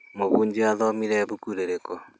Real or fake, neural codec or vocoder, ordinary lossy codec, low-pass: real; none; none; none